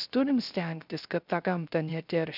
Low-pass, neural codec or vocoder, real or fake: 5.4 kHz; codec, 16 kHz, 0.3 kbps, FocalCodec; fake